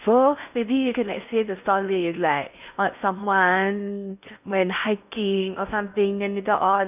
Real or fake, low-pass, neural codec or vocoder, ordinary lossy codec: fake; 3.6 kHz; codec, 16 kHz in and 24 kHz out, 0.6 kbps, FocalCodec, streaming, 4096 codes; none